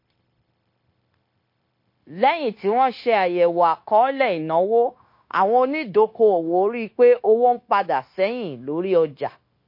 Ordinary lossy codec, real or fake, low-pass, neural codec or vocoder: MP3, 32 kbps; fake; 5.4 kHz; codec, 16 kHz, 0.9 kbps, LongCat-Audio-Codec